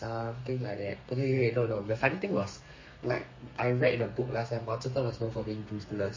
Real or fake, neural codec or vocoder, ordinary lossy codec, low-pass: fake; codec, 32 kHz, 1.9 kbps, SNAC; MP3, 32 kbps; 7.2 kHz